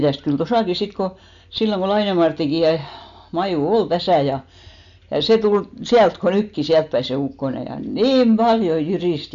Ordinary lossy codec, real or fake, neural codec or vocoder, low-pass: none; real; none; 7.2 kHz